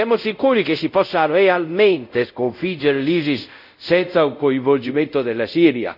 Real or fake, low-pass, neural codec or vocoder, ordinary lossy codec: fake; 5.4 kHz; codec, 24 kHz, 0.5 kbps, DualCodec; none